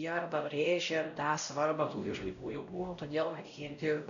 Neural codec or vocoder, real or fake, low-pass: codec, 16 kHz, 0.5 kbps, X-Codec, WavLM features, trained on Multilingual LibriSpeech; fake; 7.2 kHz